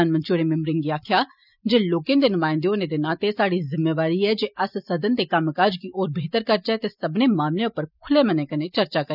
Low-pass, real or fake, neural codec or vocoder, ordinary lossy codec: 5.4 kHz; real; none; none